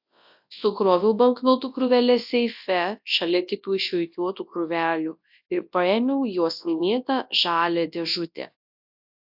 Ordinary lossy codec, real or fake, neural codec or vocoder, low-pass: AAC, 48 kbps; fake; codec, 24 kHz, 0.9 kbps, WavTokenizer, large speech release; 5.4 kHz